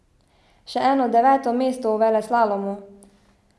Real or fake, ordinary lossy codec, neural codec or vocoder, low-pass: real; none; none; none